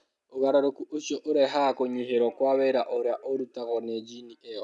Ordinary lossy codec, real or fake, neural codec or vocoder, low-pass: none; real; none; none